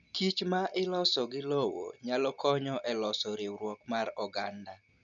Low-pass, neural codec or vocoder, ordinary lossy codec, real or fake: 7.2 kHz; none; none; real